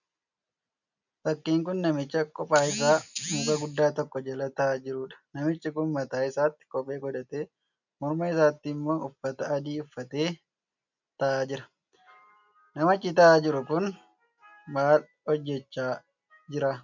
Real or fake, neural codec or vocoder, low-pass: real; none; 7.2 kHz